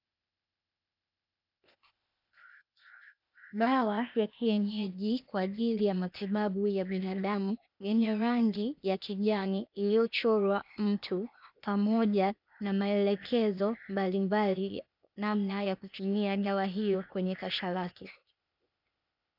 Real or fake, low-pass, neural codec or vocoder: fake; 5.4 kHz; codec, 16 kHz, 0.8 kbps, ZipCodec